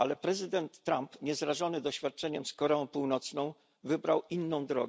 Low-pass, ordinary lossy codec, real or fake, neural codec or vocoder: none; none; real; none